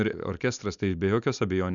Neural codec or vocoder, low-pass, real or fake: none; 7.2 kHz; real